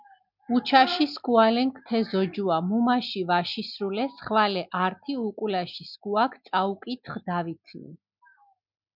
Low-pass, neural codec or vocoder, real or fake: 5.4 kHz; none; real